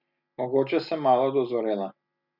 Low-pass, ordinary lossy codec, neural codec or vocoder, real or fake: 5.4 kHz; none; none; real